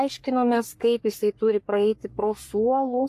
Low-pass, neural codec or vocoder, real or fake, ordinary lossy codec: 14.4 kHz; codec, 44.1 kHz, 3.4 kbps, Pupu-Codec; fake; AAC, 64 kbps